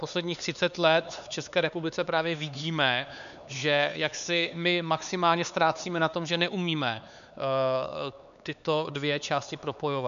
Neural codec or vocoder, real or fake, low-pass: codec, 16 kHz, 4 kbps, X-Codec, HuBERT features, trained on LibriSpeech; fake; 7.2 kHz